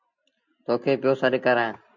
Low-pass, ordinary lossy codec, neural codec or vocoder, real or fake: 7.2 kHz; MP3, 64 kbps; none; real